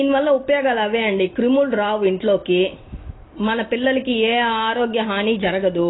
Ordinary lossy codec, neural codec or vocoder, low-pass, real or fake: AAC, 16 kbps; none; 7.2 kHz; real